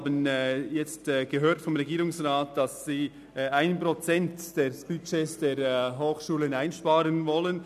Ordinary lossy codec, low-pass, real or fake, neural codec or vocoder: none; 14.4 kHz; real; none